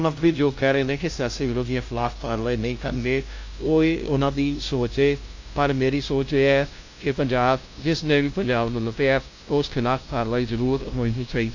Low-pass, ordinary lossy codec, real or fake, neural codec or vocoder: 7.2 kHz; AAC, 48 kbps; fake; codec, 16 kHz, 0.5 kbps, FunCodec, trained on LibriTTS, 25 frames a second